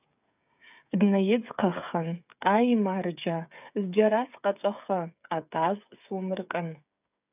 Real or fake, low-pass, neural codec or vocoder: fake; 3.6 kHz; codec, 16 kHz, 8 kbps, FreqCodec, smaller model